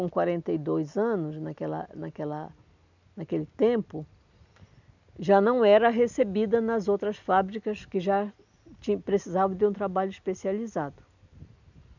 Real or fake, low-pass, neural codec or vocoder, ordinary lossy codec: real; 7.2 kHz; none; none